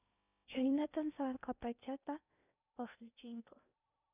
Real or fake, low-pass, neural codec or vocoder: fake; 3.6 kHz; codec, 16 kHz in and 24 kHz out, 0.6 kbps, FocalCodec, streaming, 2048 codes